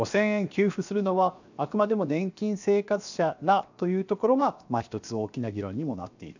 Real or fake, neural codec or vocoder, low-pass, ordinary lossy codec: fake; codec, 16 kHz, 0.7 kbps, FocalCodec; 7.2 kHz; none